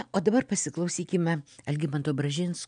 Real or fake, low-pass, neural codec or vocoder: real; 9.9 kHz; none